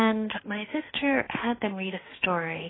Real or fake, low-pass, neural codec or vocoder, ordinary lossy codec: fake; 7.2 kHz; autoencoder, 48 kHz, 32 numbers a frame, DAC-VAE, trained on Japanese speech; AAC, 16 kbps